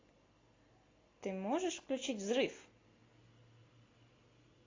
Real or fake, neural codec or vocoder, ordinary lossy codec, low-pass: real; none; AAC, 32 kbps; 7.2 kHz